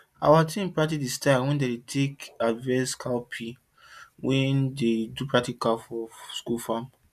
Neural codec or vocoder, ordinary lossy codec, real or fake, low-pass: none; none; real; 14.4 kHz